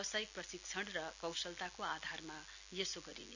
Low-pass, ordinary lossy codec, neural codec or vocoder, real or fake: 7.2 kHz; none; none; real